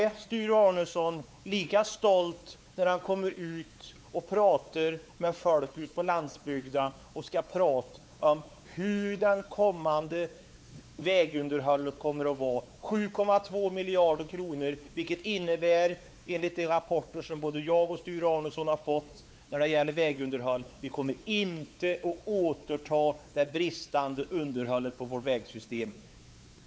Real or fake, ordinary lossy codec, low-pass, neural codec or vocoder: fake; none; none; codec, 16 kHz, 4 kbps, X-Codec, WavLM features, trained on Multilingual LibriSpeech